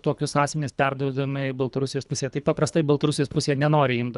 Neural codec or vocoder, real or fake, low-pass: codec, 24 kHz, 3 kbps, HILCodec; fake; 10.8 kHz